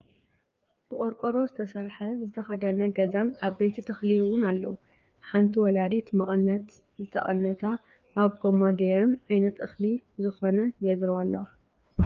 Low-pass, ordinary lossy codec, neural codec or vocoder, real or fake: 7.2 kHz; Opus, 24 kbps; codec, 16 kHz, 2 kbps, FreqCodec, larger model; fake